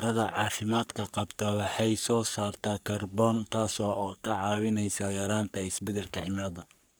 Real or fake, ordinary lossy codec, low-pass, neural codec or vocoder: fake; none; none; codec, 44.1 kHz, 3.4 kbps, Pupu-Codec